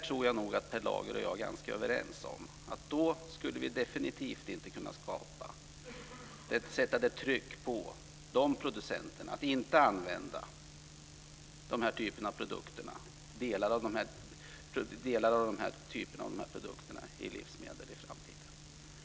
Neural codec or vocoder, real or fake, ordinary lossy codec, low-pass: none; real; none; none